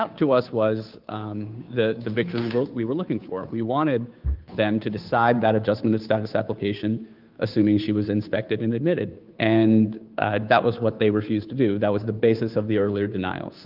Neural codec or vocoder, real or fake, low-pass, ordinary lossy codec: codec, 16 kHz, 2 kbps, FunCodec, trained on Chinese and English, 25 frames a second; fake; 5.4 kHz; Opus, 32 kbps